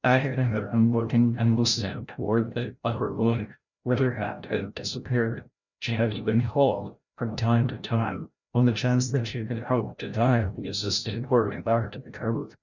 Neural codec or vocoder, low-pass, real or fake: codec, 16 kHz, 0.5 kbps, FreqCodec, larger model; 7.2 kHz; fake